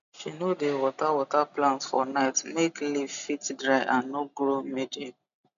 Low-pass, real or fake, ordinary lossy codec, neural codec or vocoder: 7.2 kHz; real; none; none